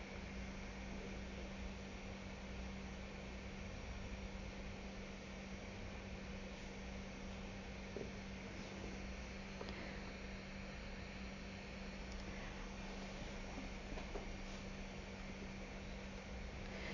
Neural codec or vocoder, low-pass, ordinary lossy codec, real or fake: none; 7.2 kHz; none; real